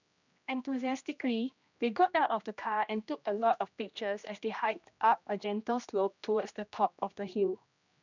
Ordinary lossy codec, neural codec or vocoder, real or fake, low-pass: none; codec, 16 kHz, 1 kbps, X-Codec, HuBERT features, trained on general audio; fake; 7.2 kHz